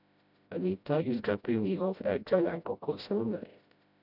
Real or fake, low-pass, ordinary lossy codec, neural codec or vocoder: fake; 5.4 kHz; none; codec, 16 kHz, 0.5 kbps, FreqCodec, smaller model